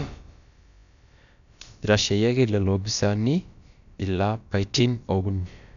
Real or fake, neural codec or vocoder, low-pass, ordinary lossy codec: fake; codec, 16 kHz, about 1 kbps, DyCAST, with the encoder's durations; 7.2 kHz; none